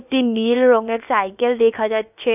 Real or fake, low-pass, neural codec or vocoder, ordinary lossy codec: fake; 3.6 kHz; codec, 16 kHz, about 1 kbps, DyCAST, with the encoder's durations; none